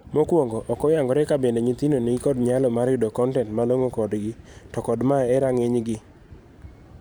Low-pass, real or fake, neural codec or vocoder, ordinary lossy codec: none; fake; vocoder, 44.1 kHz, 128 mel bands every 512 samples, BigVGAN v2; none